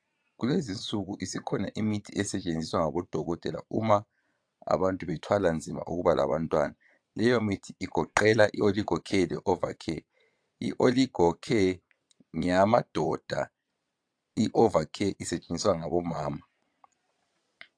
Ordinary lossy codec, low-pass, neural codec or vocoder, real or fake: AAC, 64 kbps; 9.9 kHz; vocoder, 22.05 kHz, 80 mel bands, Vocos; fake